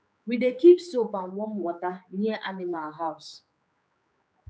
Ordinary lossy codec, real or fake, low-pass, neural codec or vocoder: none; fake; none; codec, 16 kHz, 4 kbps, X-Codec, HuBERT features, trained on general audio